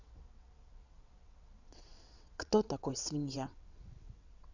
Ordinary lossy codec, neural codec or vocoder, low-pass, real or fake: none; vocoder, 22.05 kHz, 80 mel bands, WaveNeXt; 7.2 kHz; fake